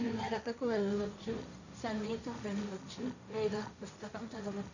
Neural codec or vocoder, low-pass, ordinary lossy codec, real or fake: codec, 16 kHz, 1.1 kbps, Voila-Tokenizer; 7.2 kHz; none; fake